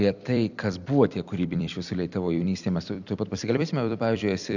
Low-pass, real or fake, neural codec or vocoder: 7.2 kHz; fake; vocoder, 44.1 kHz, 128 mel bands every 256 samples, BigVGAN v2